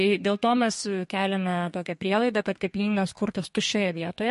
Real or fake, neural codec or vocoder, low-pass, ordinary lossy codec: fake; codec, 32 kHz, 1.9 kbps, SNAC; 14.4 kHz; MP3, 48 kbps